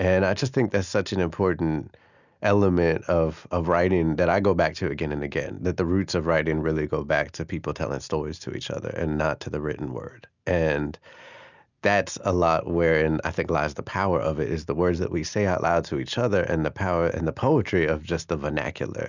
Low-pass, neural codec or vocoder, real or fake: 7.2 kHz; none; real